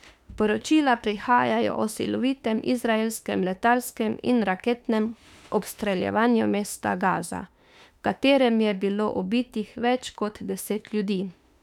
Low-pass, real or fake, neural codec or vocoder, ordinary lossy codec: 19.8 kHz; fake; autoencoder, 48 kHz, 32 numbers a frame, DAC-VAE, trained on Japanese speech; none